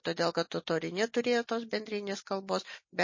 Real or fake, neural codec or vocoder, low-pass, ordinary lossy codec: real; none; 7.2 kHz; MP3, 32 kbps